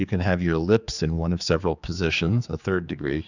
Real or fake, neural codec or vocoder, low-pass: fake; codec, 16 kHz, 4 kbps, X-Codec, HuBERT features, trained on general audio; 7.2 kHz